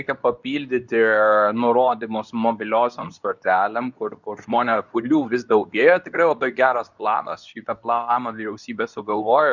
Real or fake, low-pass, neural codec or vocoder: fake; 7.2 kHz; codec, 24 kHz, 0.9 kbps, WavTokenizer, medium speech release version 2